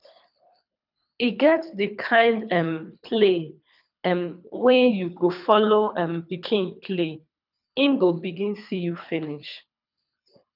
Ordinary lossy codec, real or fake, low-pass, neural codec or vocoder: none; fake; 5.4 kHz; codec, 24 kHz, 3 kbps, HILCodec